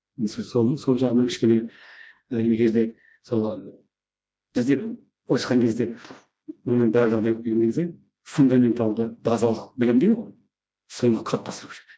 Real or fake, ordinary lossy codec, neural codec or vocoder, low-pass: fake; none; codec, 16 kHz, 1 kbps, FreqCodec, smaller model; none